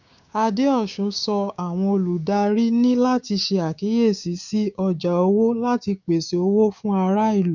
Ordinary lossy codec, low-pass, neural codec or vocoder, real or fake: none; 7.2 kHz; codec, 16 kHz, 6 kbps, DAC; fake